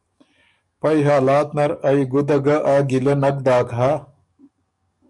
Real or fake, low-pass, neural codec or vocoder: fake; 10.8 kHz; codec, 44.1 kHz, 7.8 kbps, DAC